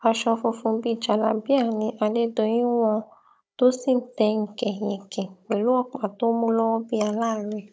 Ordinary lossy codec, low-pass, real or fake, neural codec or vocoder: none; none; fake; codec, 16 kHz, 16 kbps, FunCodec, trained on Chinese and English, 50 frames a second